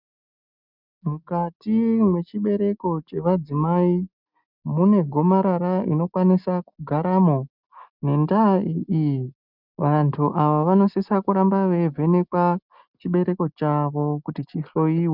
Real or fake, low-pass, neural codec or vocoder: real; 5.4 kHz; none